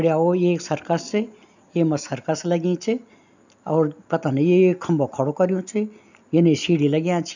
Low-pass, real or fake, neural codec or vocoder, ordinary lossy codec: 7.2 kHz; real; none; none